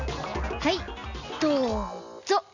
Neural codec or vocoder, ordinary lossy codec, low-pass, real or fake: none; none; 7.2 kHz; real